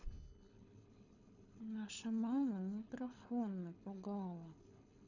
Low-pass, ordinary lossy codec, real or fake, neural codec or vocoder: 7.2 kHz; none; fake; codec, 24 kHz, 6 kbps, HILCodec